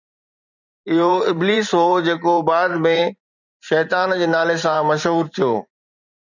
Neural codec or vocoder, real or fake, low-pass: vocoder, 44.1 kHz, 128 mel bands every 512 samples, BigVGAN v2; fake; 7.2 kHz